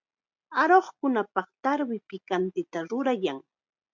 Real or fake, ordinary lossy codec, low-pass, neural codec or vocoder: real; MP3, 64 kbps; 7.2 kHz; none